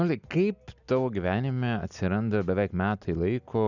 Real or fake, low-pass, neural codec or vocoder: real; 7.2 kHz; none